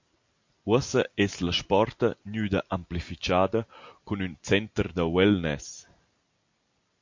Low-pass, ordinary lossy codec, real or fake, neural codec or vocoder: 7.2 kHz; MP3, 48 kbps; real; none